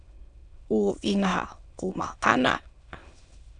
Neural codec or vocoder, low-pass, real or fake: autoencoder, 22.05 kHz, a latent of 192 numbers a frame, VITS, trained on many speakers; 9.9 kHz; fake